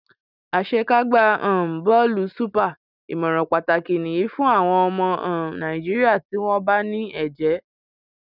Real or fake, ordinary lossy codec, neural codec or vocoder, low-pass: real; none; none; 5.4 kHz